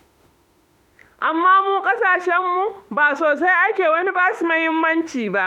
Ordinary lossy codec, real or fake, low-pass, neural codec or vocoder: none; fake; 19.8 kHz; autoencoder, 48 kHz, 32 numbers a frame, DAC-VAE, trained on Japanese speech